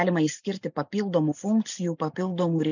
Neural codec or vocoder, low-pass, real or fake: none; 7.2 kHz; real